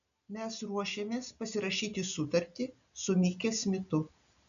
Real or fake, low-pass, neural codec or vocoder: real; 7.2 kHz; none